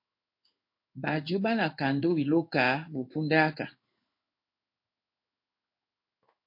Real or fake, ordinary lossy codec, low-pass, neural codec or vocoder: fake; MP3, 32 kbps; 5.4 kHz; codec, 16 kHz in and 24 kHz out, 1 kbps, XY-Tokenizer